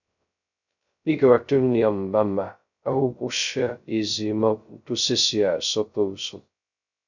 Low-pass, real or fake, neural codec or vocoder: 7.2 kHz; fake; codec, 16 kHz, 0.2 kbps, FocalCodec